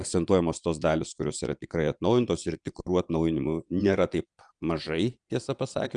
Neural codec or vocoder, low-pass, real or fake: vocoder, 22.05 kHz, 80 mel bands, Vocos; 9.9 kHz; fake